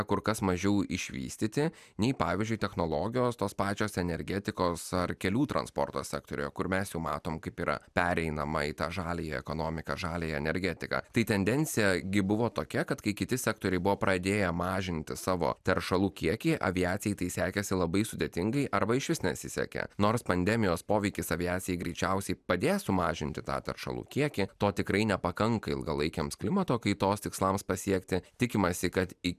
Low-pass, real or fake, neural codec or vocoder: 14.4 kHz; real; none